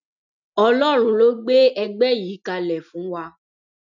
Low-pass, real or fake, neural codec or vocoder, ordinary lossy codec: 7.2 kHz; real; none; none